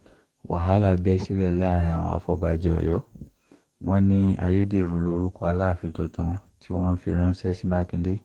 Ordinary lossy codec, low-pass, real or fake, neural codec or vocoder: Opus, 32 kbps; 19.8 kHz; fake; codec, 44.1 kHz, 2.6 kbps, DAC